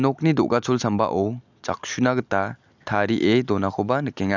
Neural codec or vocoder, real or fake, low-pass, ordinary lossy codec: none; real; 7.2 kHz; none